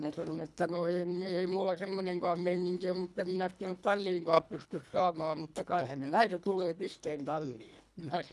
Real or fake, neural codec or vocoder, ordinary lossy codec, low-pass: fake; codec, 24 kHz, 1.5 kbps, HILCodec; none; none